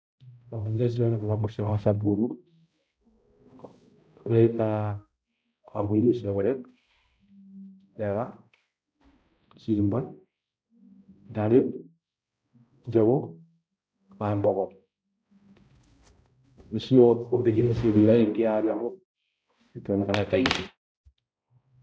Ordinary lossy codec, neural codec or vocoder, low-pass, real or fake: none; codec, 16 kHz, 0.5 kbps, X-Codec, HuBERT features, trained on balanced general audio; none; fake